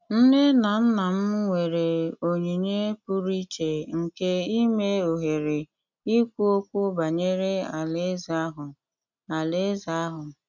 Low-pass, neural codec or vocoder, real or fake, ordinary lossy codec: 7.2 kHz; none; real; none